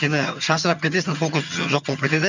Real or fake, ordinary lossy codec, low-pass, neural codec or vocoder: fake; none; 7.2 kHz; vocoder, 22.05 kHz, 80 mel bands, HiFi-GAN